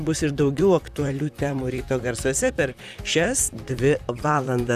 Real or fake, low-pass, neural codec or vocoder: fake; 14.4 kHz; vocoder, 44.1 kHz, 128 mel bands, Pupu-Vocoder